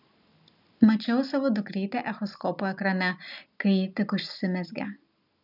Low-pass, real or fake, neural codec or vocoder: 5.4 kHz; real; none